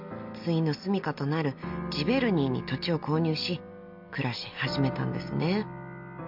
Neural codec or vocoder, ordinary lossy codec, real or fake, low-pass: none; MP3, 48 kbps; real; 5.4 kHz